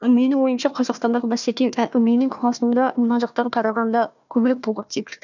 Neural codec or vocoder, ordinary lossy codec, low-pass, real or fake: codec, 16 kHz, 1 kbps, FunCodec, trained on Chinese and English, 50 frames a second; none; 7.2 kHz; fake